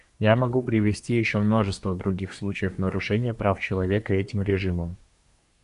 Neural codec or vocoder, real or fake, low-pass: codec, 24 kHz, 1 kbps, SNAC; fake; 10.8 kHz